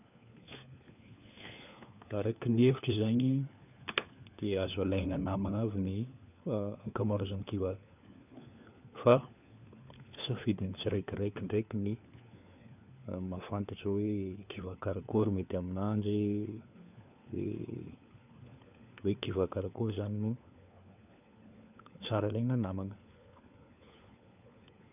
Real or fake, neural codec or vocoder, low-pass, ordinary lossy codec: fake; codec, 16 kHz, 4 kbps, FunCodec, trained on LibriTTS, 50 frames a second; 3.6 kHz; none